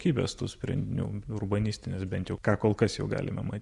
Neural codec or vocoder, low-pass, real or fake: none; 10.8 kHz; real